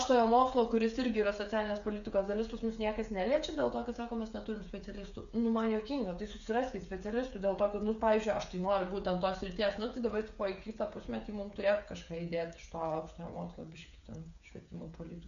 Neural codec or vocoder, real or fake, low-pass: codec, 16 kHz, 8 kbps, FreqCodec, smaller model; fake; 7.2 kHz